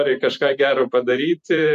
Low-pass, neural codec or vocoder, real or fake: 14.4 kHz; vocoder, 44.1 kHz, 128 mel bands every 512 samples, BigVGAN v2; fake